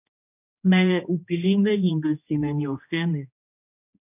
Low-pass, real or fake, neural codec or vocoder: 3.6 kHz; fake; codec, 16 kHz, 1 kbps, X-Codec, HuBERT features, trained on general audio